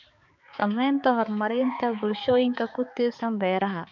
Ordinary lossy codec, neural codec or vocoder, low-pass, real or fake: MP3, 64 kbps; codec, 16 kHz, 4 kbps, X-Codec, HuBERT features, trained on balanced general audio; 7.2 kHz; fake